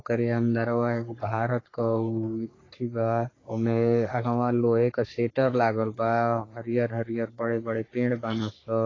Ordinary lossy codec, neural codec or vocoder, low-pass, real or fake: AAC, 32 kbps; codec, 44.1 kHz, 3.4 kbps, Pupu-Codec; 7.2 kHz; fake